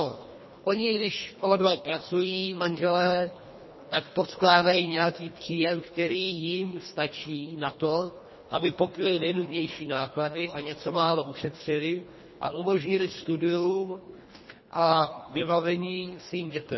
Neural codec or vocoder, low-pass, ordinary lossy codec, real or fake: codec, 24 kHz, 1.5 kbps, HILCodec; 7.2 kHz; MP3, 24 kbps; fake